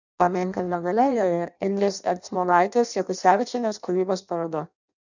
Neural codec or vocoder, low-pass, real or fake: codec, 16 kHz in and 24 kHz out, 0.6 kbps, FireRedTTS-2 codec; 7.2 kHz; fake